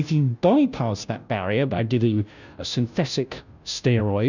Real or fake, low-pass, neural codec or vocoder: fake; 7.2 kHz; codec, 16 kHz, 0.5 kbps, FunCodec, trained on Chinese and English, 25 frames a second